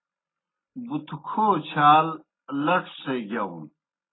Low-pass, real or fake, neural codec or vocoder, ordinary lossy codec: 7.2 kHz; real; none; AAC, 16 kbps